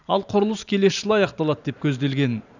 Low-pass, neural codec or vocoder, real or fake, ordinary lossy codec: 7.2 kHz; vocoder, 44.1 kHz, 128 mel bands every 512 samples, BigVGAN v2; fake; none